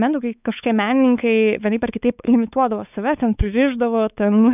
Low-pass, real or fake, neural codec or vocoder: 3.6 kHz; fake; codec, 16 kHz, 4 kbps, X-Codec, WavLM features, trained on Multilingual LibriSpeech